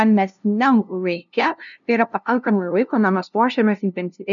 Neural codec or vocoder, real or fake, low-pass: codec, 16 kHz, 0.5 kbps, FunCodec, trained on LibriTTS, 25 frames a second; fake; 7.2 kHz